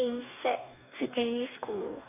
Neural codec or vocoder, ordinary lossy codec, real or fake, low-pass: codec, 44.1 kHz, 2.6 kbps, DAC; none; fake; 3.6 kHz